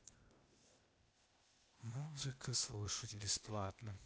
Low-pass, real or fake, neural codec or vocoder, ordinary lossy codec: none; fake; codec, 16 kHz, 0.8 kbps, ZipCodec; none